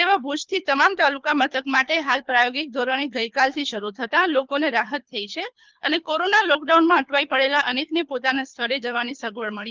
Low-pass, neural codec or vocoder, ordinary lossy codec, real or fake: 7.2 kHz; codec, 24 kHz, 3 kbps, HILCodec; Opus, 24 kbps; fake